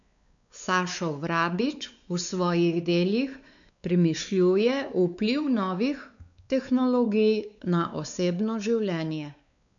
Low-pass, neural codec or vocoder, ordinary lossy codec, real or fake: 7.2 kHz; codec, 16 kHz, 4 kbps, X-Codec, WavLM features, trained on Multilingual LibriSpeech; none; fake